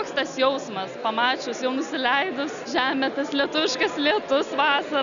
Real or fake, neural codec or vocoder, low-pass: real; none; 7.2 kHz